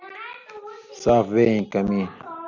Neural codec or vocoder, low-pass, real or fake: none; 7.2 kHz; real